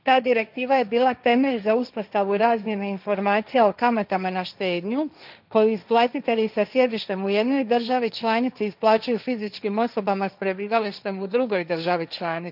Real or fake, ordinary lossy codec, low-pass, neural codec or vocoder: fake; none; 5.4 kHz; codec, 16 kHz, 1.1 kbps, Voila-Tokenizer